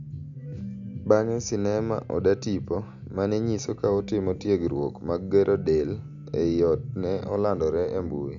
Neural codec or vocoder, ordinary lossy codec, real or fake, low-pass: none; none; real; 7.2 kHz